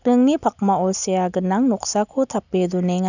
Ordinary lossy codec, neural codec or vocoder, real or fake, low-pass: none; none; real; 7.2 kHz